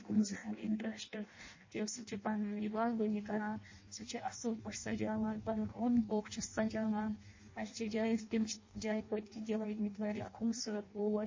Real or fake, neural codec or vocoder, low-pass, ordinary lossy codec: fake; codec, 16 kHz in and 24 kHz out, 0.6 kbps, FireRedTTS-2 codec; 7.2 kHz; MP3, 32 kbps